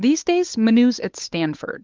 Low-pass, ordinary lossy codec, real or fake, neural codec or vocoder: 7.2 kHz; Opus, 32 kbps; fake; codec, 16 kHz, 8 kbps, FunCodec, trained on Chinese and English, 25 frames a second